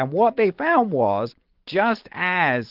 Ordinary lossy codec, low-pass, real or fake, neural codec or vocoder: Opus, 16 kbps; 5.4 kHz; real; none